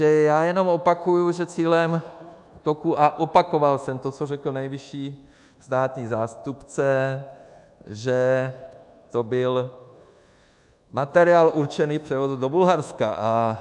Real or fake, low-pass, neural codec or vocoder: fake; 10.8 kHz; codec, 24 kHz, 1.2 kbps, DualCodec